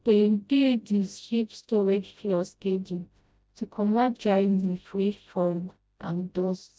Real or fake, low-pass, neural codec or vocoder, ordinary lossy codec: fake; none; codec, 16 kHz, 0.5 kbps, FreqCodec, smaller model; none